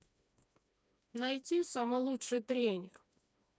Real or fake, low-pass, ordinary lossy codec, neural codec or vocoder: fake; none; none; codec, 16 kHz, 2 kbps, FreqCodec, smaller model